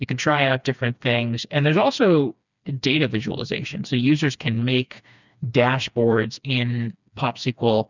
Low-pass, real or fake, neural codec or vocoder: 7.2 kHz; fake; codec, 16 kHz, 2 kbps, FreqCodec, smaller model